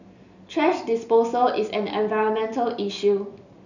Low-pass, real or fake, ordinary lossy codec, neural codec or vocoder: 7.2 kHz; real; none; none